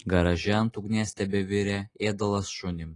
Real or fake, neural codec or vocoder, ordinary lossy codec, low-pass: real; none; AAC, 32 kbps; 10.8 kHz